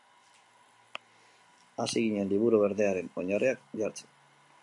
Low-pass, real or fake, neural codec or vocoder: 10.8 kHz; real; none